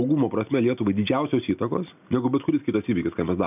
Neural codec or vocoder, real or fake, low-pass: none; real; 3.6 kHz